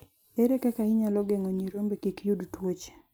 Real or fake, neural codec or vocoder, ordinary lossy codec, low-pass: real; none; none; none